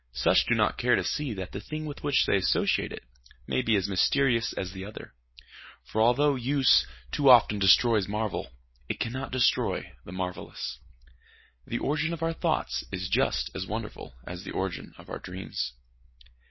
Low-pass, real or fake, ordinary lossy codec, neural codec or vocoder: 7.2 kHz; real; MP3, 24 kbps; none